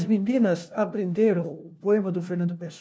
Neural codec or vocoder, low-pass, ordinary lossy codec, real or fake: codec, 16 kHz, 1 kbps, FunCodec, trained on LibriTTS, 50 frames a second; none; none; fake